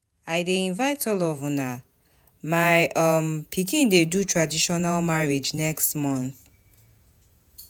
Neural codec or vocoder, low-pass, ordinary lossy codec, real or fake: vocoder, 48 kHz, 128 mel bands, Vocos; none; none; fake